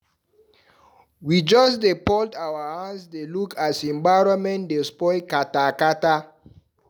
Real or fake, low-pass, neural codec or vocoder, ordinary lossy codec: real; none; none; none